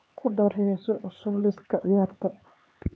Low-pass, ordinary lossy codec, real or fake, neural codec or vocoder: none; none; fake; codec, 16 kHz, 4 kbps, X-Codec, HuBERT features, trained on LibriSpeech